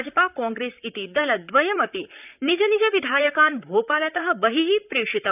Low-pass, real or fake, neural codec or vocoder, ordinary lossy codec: 3.6 kHz; fake; vocoder, 44.1 kHz, 128 mel bands, Pupu-Vocoder; none